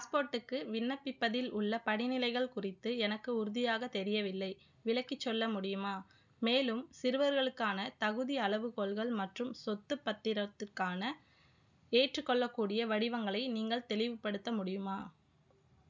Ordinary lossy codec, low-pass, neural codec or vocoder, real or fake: none; 7.2 kHz; none; real